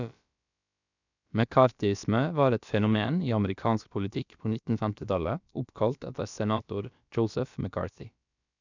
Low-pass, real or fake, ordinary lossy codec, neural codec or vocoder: 7.2 kHz; fake; none; codec, 16 kHz, about 1 kbps, DyCAST, with the encoder's durations